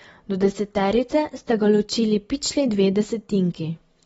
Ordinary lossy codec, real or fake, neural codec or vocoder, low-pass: AAC, 24 kbps; real; none; 10.8 kHz